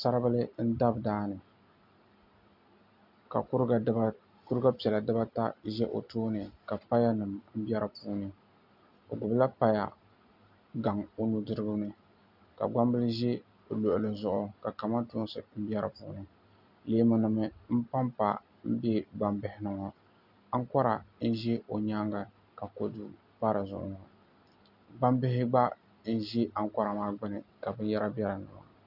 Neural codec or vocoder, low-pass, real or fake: none; 5.4 kHz; real